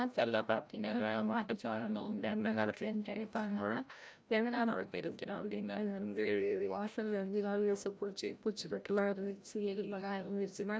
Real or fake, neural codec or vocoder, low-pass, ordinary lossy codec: fake; codec, 16 kHz, 0.5 kbps, FreqCodec, larger model; none; none